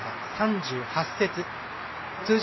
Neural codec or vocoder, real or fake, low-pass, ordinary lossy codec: none; real; 7.2 kHz; MP3, 24 kbps